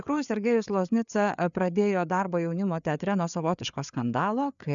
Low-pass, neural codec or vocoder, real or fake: 7.2 kHz; codec, 16 kHz, 4 kbps, FreqCodec, larger model; fake